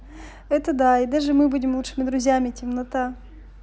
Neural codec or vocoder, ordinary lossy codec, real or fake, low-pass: none; none; real; none